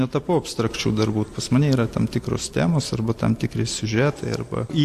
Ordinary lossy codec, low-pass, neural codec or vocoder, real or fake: AAC, 64 kbps; 14.4 kHz; none; real